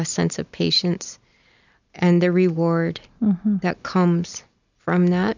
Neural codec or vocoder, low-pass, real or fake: none; 7.2 kHz; real